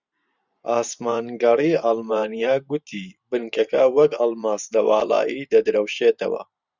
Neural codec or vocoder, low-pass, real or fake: vocoder, 44.1 kHz, 128 mel bands every 512 samples, BigVGAN v2; 7.2 kHz; fake